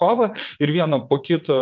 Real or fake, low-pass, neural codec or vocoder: fake; 7.2 kHz; vocoder, 22.05 kHz, 80 mel bands, WaveNeXt